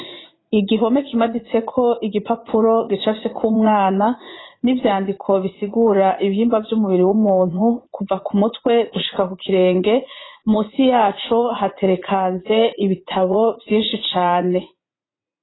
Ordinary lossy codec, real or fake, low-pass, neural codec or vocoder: AAC, 16 kbps; fake; 7.2 kHz; vocoder, 44.1 kHz, 80 mel bands, Vocos